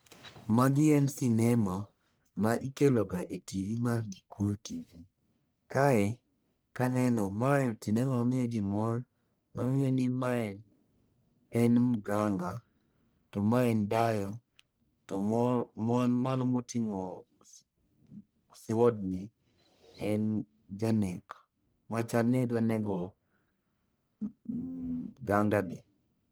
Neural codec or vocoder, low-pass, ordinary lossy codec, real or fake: codec, 44.1 kHz, 1.7 kbps, Pupu-Codec; none; none; fake